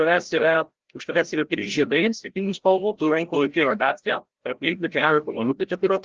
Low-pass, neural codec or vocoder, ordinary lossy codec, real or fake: 7.2 kHz; codec, 16 kHz, 0.5 kbps, FreqCodec, larger model; Opus, 16 kbps; fake